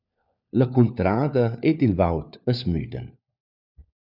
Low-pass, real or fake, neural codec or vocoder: 5.4 kHz; fake; codec, 16 kHz, 4 kbps, FunCodec, trained on LibriTTS, 50 frames a second